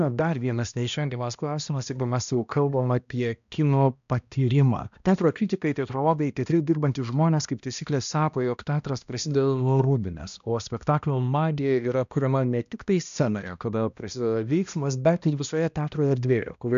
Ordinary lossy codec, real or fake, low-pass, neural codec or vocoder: AAC, 96 kbps; fake; 7.2 kHz; codec, 16 kHz, 1 kbps, X-Codec, HuBERT features, trained on balanced general audio